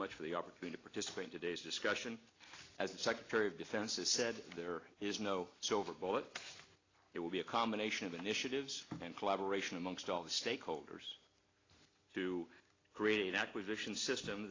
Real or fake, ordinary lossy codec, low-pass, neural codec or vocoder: real; AAC, 32 kbps; 7.2 kHz; none